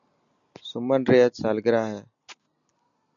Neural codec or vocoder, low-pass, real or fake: none; 7.2 kHz; real